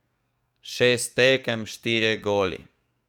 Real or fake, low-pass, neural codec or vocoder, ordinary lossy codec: fake; 19.8 kHz; codec, 44.1 kHz, 7.8 kbps, DAC; none